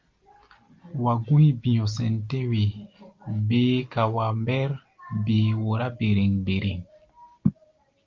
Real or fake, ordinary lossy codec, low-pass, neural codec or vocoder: real; Opus, 32 kbps; 7.2 kHz; none